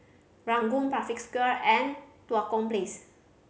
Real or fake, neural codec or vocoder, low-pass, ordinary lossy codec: real; none; none; none